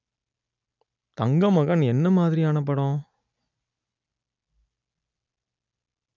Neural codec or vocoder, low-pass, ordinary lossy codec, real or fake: none; 7.2 kHz; none; real